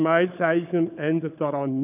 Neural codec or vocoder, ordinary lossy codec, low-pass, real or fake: codec, 16 kHz, 16 kbps, FunCodec, trained on LibriTTS, 50 frames a second; AAC, 32 kbps; 3.6 kHz; fake